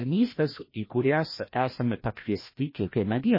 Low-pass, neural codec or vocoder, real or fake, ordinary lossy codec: 5.4 kHz; codec, 16 kHz, 1 kbps, FreqCodec, larger model; fake; MP3, 24 kbps